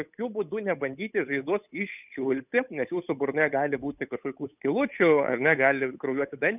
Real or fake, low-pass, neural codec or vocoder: fake; 3.6 kHz; codec, 16 kHz, 8 kbps, FunCodec, trained on Chinese and English, 25 frames a second